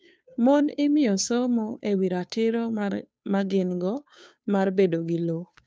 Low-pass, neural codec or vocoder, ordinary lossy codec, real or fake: none; codec, 16 kHz, 2 kbps, FunCodec, trained on Chinese and English, 25 frames a second; none; fake